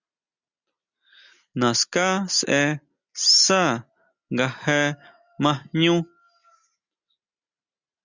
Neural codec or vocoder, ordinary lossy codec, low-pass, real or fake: none; Opus, 64 kbps; 7.2 kHz; real